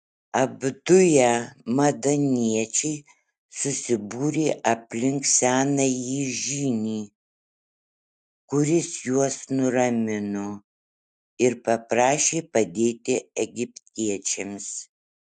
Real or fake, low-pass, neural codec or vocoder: real; 10.8 kHz; none